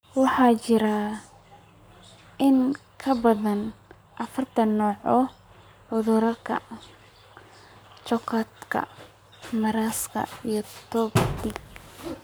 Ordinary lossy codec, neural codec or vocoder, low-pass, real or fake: none; codec, 44.1 kHz, 7.8 kbps, Pupu-Codec; none; fake